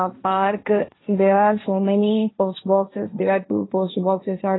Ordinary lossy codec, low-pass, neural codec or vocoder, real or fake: AAC, 16 kbps; 7.2 kHz; codec, 16 kHz, 1.1 kbps, Voila-Tokenizer; fake